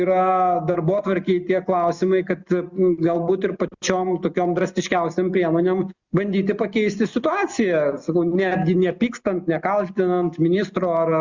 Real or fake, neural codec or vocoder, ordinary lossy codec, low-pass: real; none; Opus, 64 kbps; 7.2 kHz